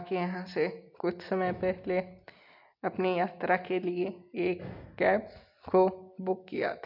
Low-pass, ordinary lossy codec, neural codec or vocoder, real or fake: 5.4 kHz; MP3, 48 kbps; none; real